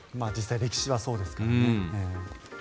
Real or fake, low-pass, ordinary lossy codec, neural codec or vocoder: real; none; none; none